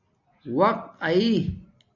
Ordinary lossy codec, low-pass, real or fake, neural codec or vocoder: MP3, 48 kbps; 7.2 kHz; real; none